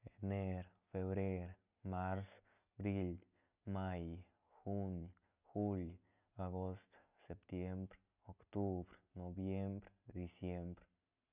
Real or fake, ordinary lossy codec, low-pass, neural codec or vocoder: real; none; 3.6 kHz; none